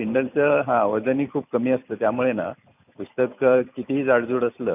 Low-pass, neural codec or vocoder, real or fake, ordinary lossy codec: 3.6 kHz; vocoder, 44.1 kHz, 128 mel bands every 256 samples, BigVGAN v2; fake; none